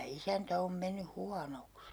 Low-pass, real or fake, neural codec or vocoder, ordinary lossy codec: none; real; none; none